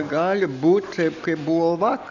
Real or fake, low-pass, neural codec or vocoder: real; 7.2 kHz; none